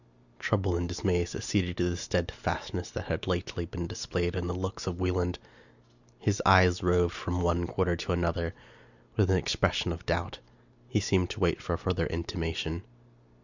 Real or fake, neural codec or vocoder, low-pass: real; none; 7.2 kHz